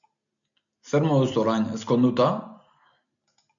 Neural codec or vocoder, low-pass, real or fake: none; 7.2 kHz; real